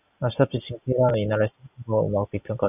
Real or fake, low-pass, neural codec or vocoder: real; 3.6 kHz; none